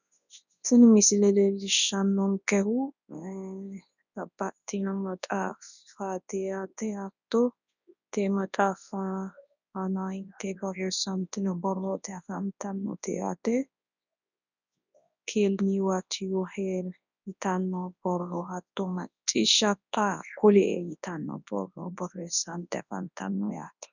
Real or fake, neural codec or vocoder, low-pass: fake; codec, 24 kHz, 0.9 kbps, WavTokenizer, large speech release; 7.2 kHz